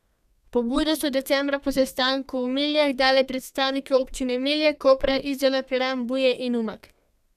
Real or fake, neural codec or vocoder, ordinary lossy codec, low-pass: fake; codec, 32 kHz, 1.9 kbps, SNAC; none; 14.4 kHz